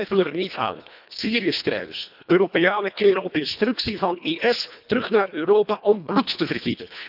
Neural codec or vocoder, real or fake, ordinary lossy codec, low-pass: codec, 24 kHz, 1.5 kbps, HILCodec; fake; none; 5.4 kHz